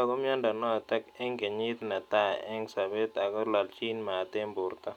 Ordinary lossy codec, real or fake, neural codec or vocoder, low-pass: none; real; none; 19.8 kHz